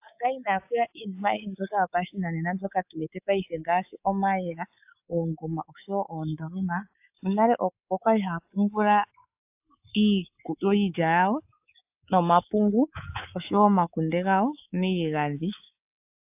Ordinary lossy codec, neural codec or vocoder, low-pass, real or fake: AAC, 32 kbps; codec, 24 kHz, 3.1 kbps, DualCodec; 3.6 kHz; fake